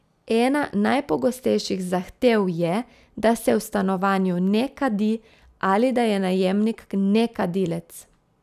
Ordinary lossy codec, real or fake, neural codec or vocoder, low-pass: none; real; none; 14.4 kHz